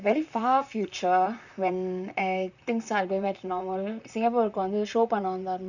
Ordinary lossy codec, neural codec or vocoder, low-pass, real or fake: none; vocoder, 44.1 kHz, 128 mel bands, Pupu-Vocoder; 7.2 kHz; fake